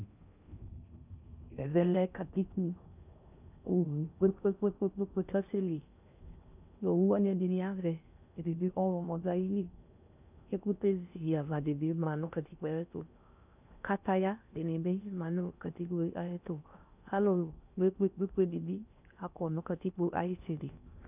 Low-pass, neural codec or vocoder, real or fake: 3.6 kHz; codec, 16 kHz in and 24 kHz out, 0.6 kbps, FocalCodec, streaming, 4096 codes; fake